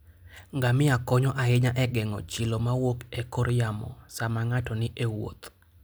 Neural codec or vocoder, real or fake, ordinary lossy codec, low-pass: none; real; none; none